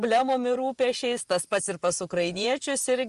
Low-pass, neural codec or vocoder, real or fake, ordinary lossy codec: 10.8 kHz; vocoder, 24 kHz, 100 mel bands, Vocos; fake; Opus, 32 kbps